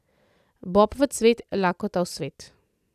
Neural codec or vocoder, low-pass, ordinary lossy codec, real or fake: none; 14.4 kHz; none; real